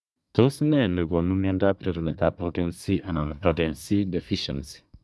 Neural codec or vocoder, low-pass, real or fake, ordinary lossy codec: codec, 24 kHz, 1 kbps, SNAC; none; fake; none